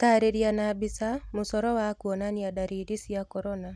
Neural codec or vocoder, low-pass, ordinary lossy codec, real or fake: none; none; none; real